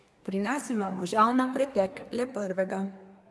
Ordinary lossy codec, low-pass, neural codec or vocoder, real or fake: none; none; codec, 24 kHz, 1 kbps, SNAC; fake